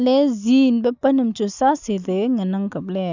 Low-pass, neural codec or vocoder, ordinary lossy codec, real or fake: 7.2 kHz; none; none; real